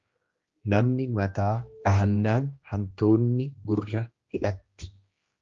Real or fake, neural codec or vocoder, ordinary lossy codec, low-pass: fake; codec, 16 kHz, 1 kbps, X-Codec, HuBERT features, trained on general audio; Opus, 32 kbps; 7.2 kHz